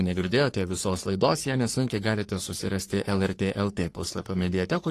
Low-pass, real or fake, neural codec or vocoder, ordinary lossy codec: 14.4 kHz; fake; codec, 44.1 kHz, 3.4 kbps, Pupu-Codec; AAC, 48 kbps